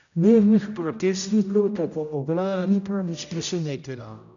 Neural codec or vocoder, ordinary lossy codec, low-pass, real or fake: codec, 16 kHz, 0.5 kbps, X-Codec, HuBERT features, trained on general audio; none; 7.2 kHz; fake